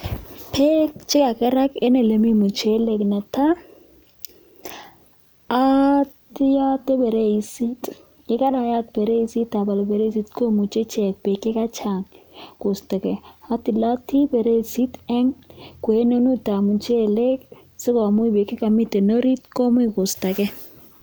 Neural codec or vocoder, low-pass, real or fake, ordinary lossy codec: none; none; real; none